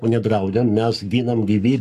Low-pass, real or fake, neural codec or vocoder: 14.4 kHz; fake; codec, 44.1 kHz, 7.8 kbps, Pupu-Codec